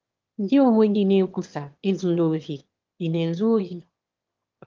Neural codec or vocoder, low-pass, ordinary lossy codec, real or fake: autoencoder, 22.05 kHz, a latent of 192 numbers a frame, VITS, trained on one speaker; 7.2 kHz; Opus, 32 kbps; fake